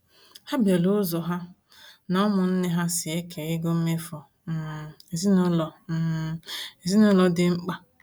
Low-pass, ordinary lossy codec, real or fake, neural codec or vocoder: none; none; real; none